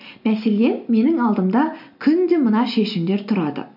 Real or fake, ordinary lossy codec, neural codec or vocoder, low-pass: real; none; none; 5.4 kHz